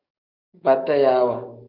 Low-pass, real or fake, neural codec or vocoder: 5.4 kHz; fake; codec, 44.1 kHz, 7.8 kbps, DAC